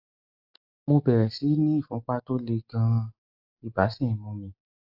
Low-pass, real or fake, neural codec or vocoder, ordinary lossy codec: 5.4 kHz; real; none; AAC, 48 kbps